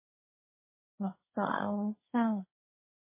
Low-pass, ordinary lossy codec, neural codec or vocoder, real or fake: 3.6 kHz; MP3, 16 kbps; codec, 16 kHz, 1.1 kbps, Voila-Tokenizer; fake